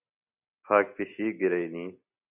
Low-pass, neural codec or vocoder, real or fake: 3.6 kHz; none; real